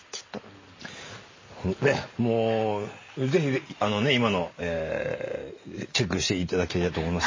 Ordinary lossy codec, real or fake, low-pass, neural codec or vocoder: MP3, 32 kbps; real; 7.2 kHz; none